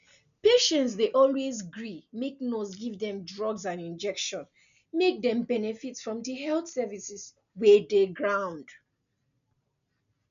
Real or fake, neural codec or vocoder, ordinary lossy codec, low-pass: real; none; none; 7.2 kHz